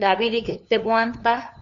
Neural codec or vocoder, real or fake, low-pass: codec, 16 kHz, 2 kbps, FunCodec, trained on LibriTTS, 25 frames a second; fake; 7.2 kHz